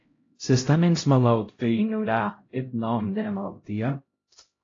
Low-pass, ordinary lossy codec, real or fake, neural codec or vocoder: 7.2 kHz; AAC, 32 kbps; fake; codec, 16 kHz, 0.5 kbps, X-Codec, HuBERT features, trained on LibriSpeech